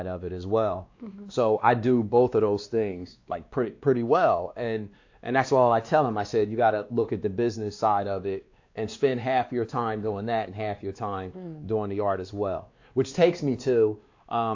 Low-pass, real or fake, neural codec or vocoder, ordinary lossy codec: 7.2 kHz; fake; codec, 16 kHz, 2 kbps, X-Codec, WavLM features, trained on Multilingual LibriSpeech; AAC, 48 kbps